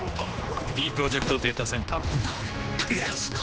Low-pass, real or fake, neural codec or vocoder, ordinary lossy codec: none; fake; codec, 16 kHz, 2 kbps, X-Codec, HuBERT features, trained on general audio; none